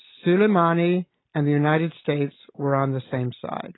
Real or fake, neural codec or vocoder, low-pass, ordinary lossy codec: real; none; 7.2 kHz; AAC, 16 kbps